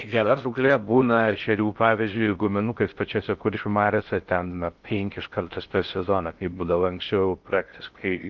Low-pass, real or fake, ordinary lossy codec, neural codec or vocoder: 7.2 kHz; fake; Opus, 24 kbps; codec, 16 kHz in and 24 kHz out, 0.6 kbps, FocalCodec, streaming, 2048 codes